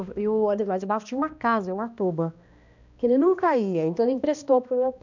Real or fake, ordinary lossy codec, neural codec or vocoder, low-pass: fake; none; codec, 16 kHz, 1 kbps, X-Codec, HuBERT features, trained on balanced general audio; 7.2 kHz